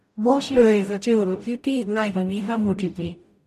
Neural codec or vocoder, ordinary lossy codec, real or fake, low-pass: codec, 44.1 kHz, 0.9 kbps, DAC; none; fake; 14.4 kHz